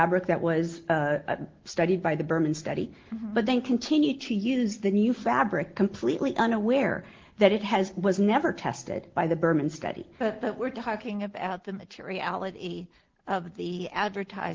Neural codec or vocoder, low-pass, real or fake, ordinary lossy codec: none; 7.2 kHz; real; Opus, 16 kbps